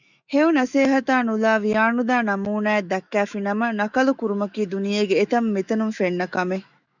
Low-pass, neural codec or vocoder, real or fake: 7.2 kHz; autoencoder, 48 kHz, 128 numbers a frame, DAC-VAE, trained on Japanese speech; fake